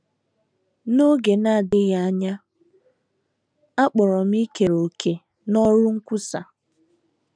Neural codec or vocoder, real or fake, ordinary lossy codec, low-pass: none; real; none; 9.9 kHz